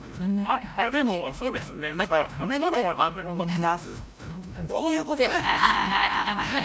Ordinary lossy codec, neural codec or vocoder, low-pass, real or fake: none; codec, 16 kHz, 0.5 kbps, FreqCodec, larger model; none; fake